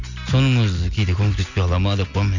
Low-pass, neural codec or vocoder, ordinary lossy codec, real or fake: 7.2 kHz; none; none; real